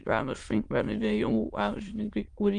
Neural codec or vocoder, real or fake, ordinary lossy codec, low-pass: autoencoder, 22.05 kHz, a latent of 192 numbers a frame, VITS, trained on many speakers; fake; Opus, 32 kbps; 9.9 kHz